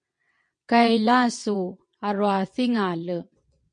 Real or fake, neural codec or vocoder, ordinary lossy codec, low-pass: fake; vocoder, 22.05 kHz, 80 mel bands, WaveNeXt; MP3, 48 kbps; 9.9 kHz